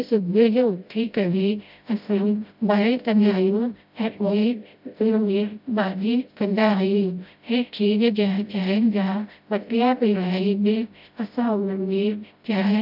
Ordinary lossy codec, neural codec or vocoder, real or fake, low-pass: AAC, 48 kbps; codec, 16 kHz, 0.5 kbps, FreqCodec, smaller model; fake; 5.4 kHz